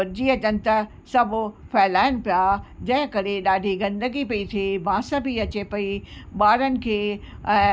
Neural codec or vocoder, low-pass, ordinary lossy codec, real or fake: none; none; none; real